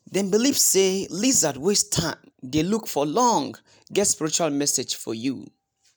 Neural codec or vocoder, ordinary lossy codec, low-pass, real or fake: none; none; none; real